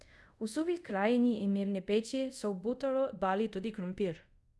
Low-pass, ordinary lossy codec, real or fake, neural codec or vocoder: none; none; fake; codec, 24 kHz, 0.5 kbps, DualCodec